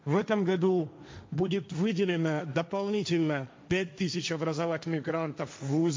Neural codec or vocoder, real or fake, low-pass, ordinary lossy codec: codec, 16 kHz, 1.1 kbps, Voila-Tokenizer; fake; none; none